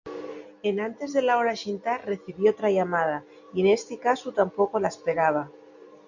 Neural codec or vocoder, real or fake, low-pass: none; real; 7.2 kHz